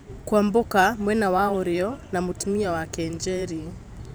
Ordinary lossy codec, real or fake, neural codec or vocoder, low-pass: none; fake; vocoder, 44.1 kHz, 128 mel bands every 512 samples, BigVGAN v2; none